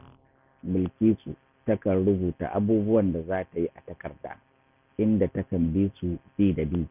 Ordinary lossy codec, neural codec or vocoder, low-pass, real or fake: none; none; 3.6 kHz; real